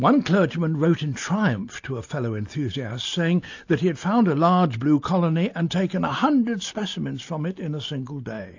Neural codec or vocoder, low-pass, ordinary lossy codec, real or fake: none; 7.2 kHz; AAC, 48 kbps; real